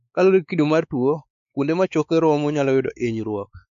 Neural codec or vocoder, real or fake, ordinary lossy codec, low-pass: codec, 16 kHz, 4 kbps, X-Codec, WavLM features, trained on Multilingual LibriSpeech; fake; none; 7.2 kHz